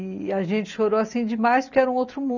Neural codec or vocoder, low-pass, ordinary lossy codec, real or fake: none; 7.2 kHz; none; real